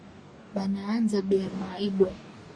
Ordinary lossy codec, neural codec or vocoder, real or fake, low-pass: Opus, 64 kbps; codec, 44.1 kHz, 2.6 kbps, DAC; fake; 9.9 kHz